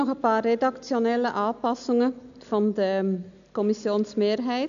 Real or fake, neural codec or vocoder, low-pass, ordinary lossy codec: real; none; 7.2 kHz; none